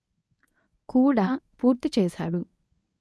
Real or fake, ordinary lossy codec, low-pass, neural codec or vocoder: fake; none; none; codec, 24 kHz, 0.9 kbps, WavTokenizer, medium speech release version 1